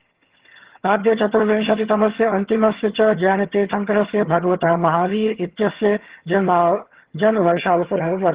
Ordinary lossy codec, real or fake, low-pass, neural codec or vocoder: Opus, 16 kbps; fake; 3.6 kHz; vocoder, 22.05 kHz, 80 mel bands, HiFi-GAN